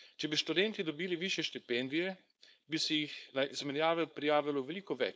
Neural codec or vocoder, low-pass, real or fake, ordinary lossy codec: codec, 16 kHz, 4.8 kbps, FACodec; none; fake; none